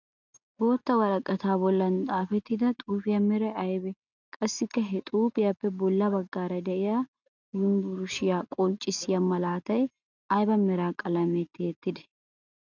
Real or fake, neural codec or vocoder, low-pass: real; none; 7.2 kHz